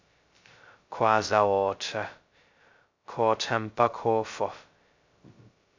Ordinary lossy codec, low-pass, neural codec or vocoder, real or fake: MP3, 64 kbps; 7.2 kHz; codec, 16 kHz, 0.2 kbps, FocalCodec; fake